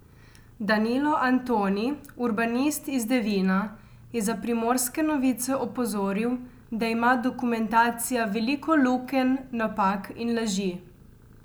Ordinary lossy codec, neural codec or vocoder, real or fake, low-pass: none; none; real; none